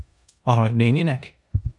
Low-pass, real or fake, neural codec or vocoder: 10.8 kHz; fake; codec, 16 kHz in and 24 kHz out, 0.9 kbps, LongCat-Audio-Codec, four codebook decoder